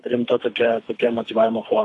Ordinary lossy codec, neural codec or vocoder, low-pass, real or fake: AAC, 48 kbps; codec, 24 kHz, 3 kbps, HILCodec; 10.8 kHz; fake